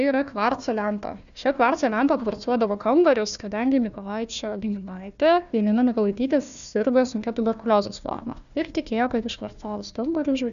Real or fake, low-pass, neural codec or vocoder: fake; 7.2 kHz; codec, 16 kHz, 1 kbps, FunCodec, trained on Chinese and English, 50 frames a second